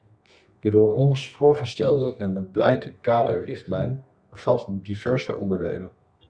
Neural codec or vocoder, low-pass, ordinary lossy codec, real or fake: codec, 24 kHz, 0.9 kbps, WavTokenizer, medium music audio release; 9.9 kHz; Opus, 64 kbps; fake